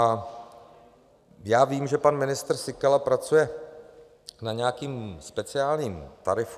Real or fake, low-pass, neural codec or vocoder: real; 14.4 kHz; none